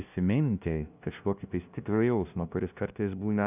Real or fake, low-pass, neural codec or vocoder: fake; 3.6 kHz; codec, 16 kHz, 0.5 kbps, FunCodec, trained on LibriTTS, 25 frames a second